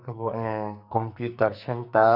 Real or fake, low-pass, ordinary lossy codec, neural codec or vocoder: fake; 5.4 kHz; none; codec, 44.1 kHz, 2.6 kbps, SNAC